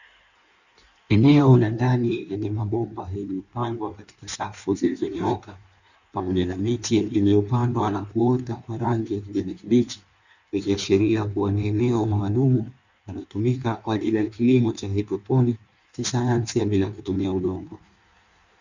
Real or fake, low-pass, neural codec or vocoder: fake; 7.2 kHz; codec, 16 kHz in and 24 kHz out, 1.1 kbps, FireRedTTS-2 codec